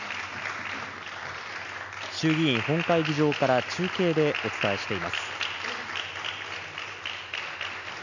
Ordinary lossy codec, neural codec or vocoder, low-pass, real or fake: none; none; 7.2 kHz; real